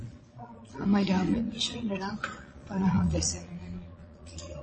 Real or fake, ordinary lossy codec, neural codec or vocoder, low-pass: fake; MP3, 32 kbps; vocoder, 22.05 kHz, 80 mel bands, Vocos; 9.9 kHz